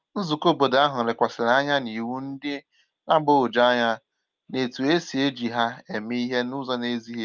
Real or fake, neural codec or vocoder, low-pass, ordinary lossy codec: real; none; 7.2 kHz; Opus, 24 kbps